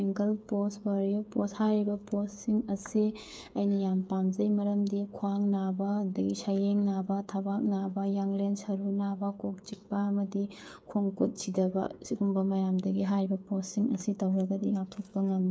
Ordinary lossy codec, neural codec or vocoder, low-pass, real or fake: none; codec, 16 kHz, 8 kbps, FreqCodec, smaller model; none; fake